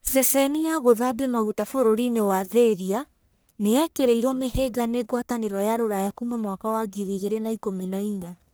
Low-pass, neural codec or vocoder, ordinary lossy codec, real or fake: none; codec, 44.1 kHz, 1.7 kbps, Pupu-Codec; none; fake